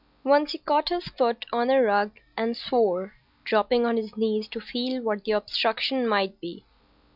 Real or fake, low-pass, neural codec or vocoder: real; 5.4 kHz; none